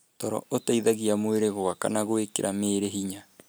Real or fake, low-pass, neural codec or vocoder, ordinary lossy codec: real; none; none; none